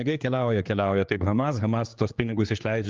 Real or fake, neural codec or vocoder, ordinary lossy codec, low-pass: fake; codec, 16 kHz, 4 kbps, X-Codec, HuBERT features, trained on general audio; Opus, 32 kbps; 7.2 kHz